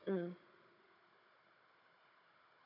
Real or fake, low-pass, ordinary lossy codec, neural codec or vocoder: fake; 5.4 kHz; AAC, 32 kbps; codec, 16 kHz, 16 kbps, FunCodec, trained on Chinese and English, 50 frames a second